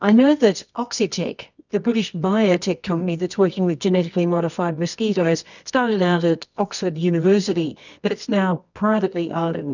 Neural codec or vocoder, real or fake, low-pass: codec, 24 kHz, 0.9 kbps, WavTokenizer, medium music audio release; fake; 7.2 kHz